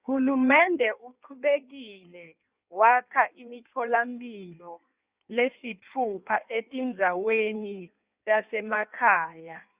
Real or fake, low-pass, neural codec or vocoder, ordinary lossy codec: fake; 3.6 kHz; codec, 16 kHz in and 24 kHz out, 1.1 kbps, FireRedTTS-2 codec; Opus, 64 kbps